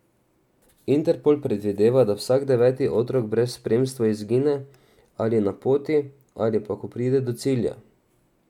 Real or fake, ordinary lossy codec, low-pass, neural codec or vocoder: real; MP3, 96 kbps; 19.8 kHz; none